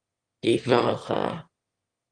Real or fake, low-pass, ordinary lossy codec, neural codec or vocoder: fake; 9.9 kHz; Opus, 32 kbps; autoencoder, 22.05 kHz, a latent of 192 numbers a frame, VITS, trained on one speaker